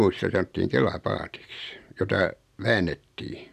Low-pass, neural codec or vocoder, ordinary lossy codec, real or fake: 14.4 kHz; none; none; real